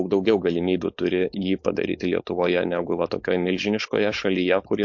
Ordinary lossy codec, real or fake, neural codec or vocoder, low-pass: MP3, 48 kbps; fake; codec, 16 kHz, 4.8 kbps, FACodec; 7.2 kHz